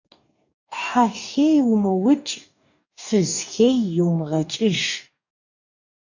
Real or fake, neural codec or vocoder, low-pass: fake; codec, 44.1 kHz, 2.6 kbps, DAC; 7.2 kHz